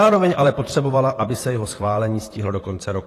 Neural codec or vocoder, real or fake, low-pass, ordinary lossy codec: vocoder, 44.1 kHz, 128 mel bands, Pupu-Vocoder; fake; 14.4 kHz; AAC, 48 kbps